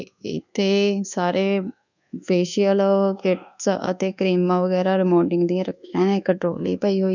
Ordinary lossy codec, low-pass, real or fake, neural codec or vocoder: none; 7.2 kHz; fake; codec, 24 kHz, 1.2 kbps, DualCodec